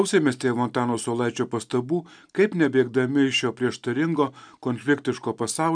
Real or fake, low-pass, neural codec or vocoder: real; 9.9 kHz; none